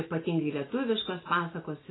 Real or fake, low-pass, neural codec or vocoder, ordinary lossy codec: real; 7.2 kHz; none; AAC, 16 kbps